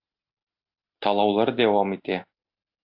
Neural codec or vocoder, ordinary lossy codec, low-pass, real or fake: none; AAC, 48 kbps; 5.4 kHz; real